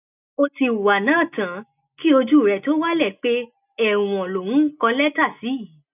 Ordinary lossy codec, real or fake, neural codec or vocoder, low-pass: none; real; none; 3.6 kHz